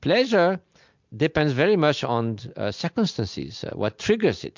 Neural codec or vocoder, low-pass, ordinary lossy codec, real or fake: none; 7.2 kHz; MP3, 64 kbps; real